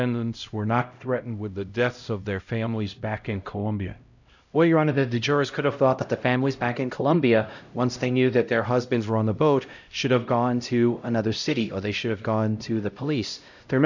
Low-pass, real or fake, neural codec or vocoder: 7.2 kHz; fake; codec, 16 kHz, 0.5 kbps, X-Codec, HuBERT features, trained on LibriSpeech